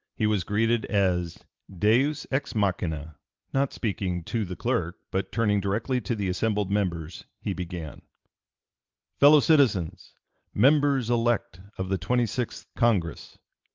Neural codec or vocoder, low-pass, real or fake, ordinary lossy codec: none; 7.2 kHz; real; Opus, 32 kbps